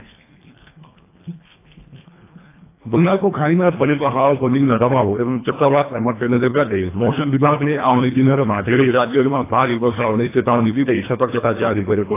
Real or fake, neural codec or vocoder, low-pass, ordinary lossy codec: fake; codec, 24 kHz, 1.5 kbps, HILCodec; 3.6 kHz; AAC, 24 kbps